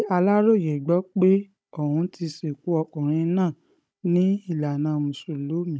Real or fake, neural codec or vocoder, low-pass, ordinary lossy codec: fake; codec, 16 kHz, 16 kbps, FunCodec, trained on Chinese and English, 50 frames a second; none; none